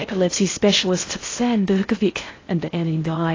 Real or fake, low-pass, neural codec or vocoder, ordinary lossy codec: fake; 7.2 kHz; codec, 16 kHz in and 24 kHz out, 0.6 kbps, FocalCodec, streaming, 4096 codes; AAC, 32 kbps